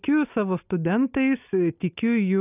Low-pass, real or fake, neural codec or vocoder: 3.6 kHz; real; none